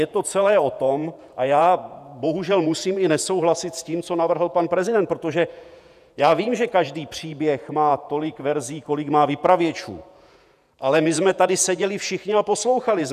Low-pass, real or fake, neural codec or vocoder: 14.4 kHz; fake; vocoder, 48 kHz, 128 mel bands, Vocos